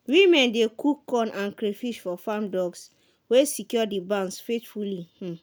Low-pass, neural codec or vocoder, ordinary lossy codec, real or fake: none; none; none; real